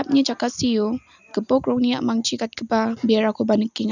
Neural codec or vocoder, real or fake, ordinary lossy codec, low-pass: none; real; none; 7.2 kHz